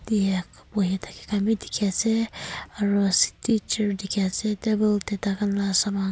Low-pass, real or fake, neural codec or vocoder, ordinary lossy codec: none; real; none; none